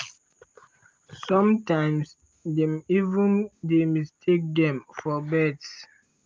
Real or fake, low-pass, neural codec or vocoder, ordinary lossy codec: real; 7.2 kHz; none; Opus, 24 kbps